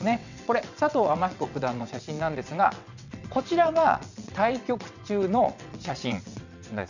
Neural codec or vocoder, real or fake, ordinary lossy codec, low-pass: vocoder, 22.05 kHz, 80 mel bands, WaveNeXt; fake; none; 7.2 kHz